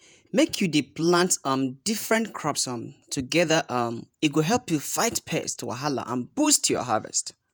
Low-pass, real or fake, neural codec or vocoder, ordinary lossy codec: none; fake; vocoder, 48 kHz, 128 mel bands, Vocos; none